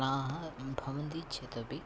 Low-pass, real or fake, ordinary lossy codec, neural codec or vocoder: none; real; none; none